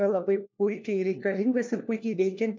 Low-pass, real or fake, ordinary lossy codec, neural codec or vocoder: 7.2 kHz; fake; MP3, 64 kbps; codec, 16 kHz, 1 kbps, FunCodec, trained on LibriTTS, 50 frames a second